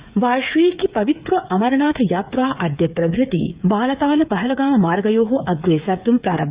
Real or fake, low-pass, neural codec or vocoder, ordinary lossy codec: fake; 3.6 kHz; codec, 16 kHz, 8 kbps, FreqCodec, smaller model; Opus, 64 kbps